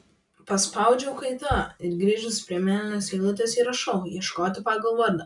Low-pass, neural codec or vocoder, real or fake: 10.8 kHz; none; real